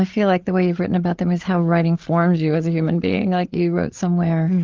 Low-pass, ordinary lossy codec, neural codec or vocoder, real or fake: 7.2 kHz; Opus, 16 kbps; codec, 16 kHz, 8 kbps, FreqCodec, larger model; fake